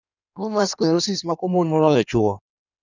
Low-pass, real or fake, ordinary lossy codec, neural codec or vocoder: 7.2 kHz; fake; none; codec, 16 kHz in and 24 kHz out, 1.1 kbps, FireRedTTS-2 codec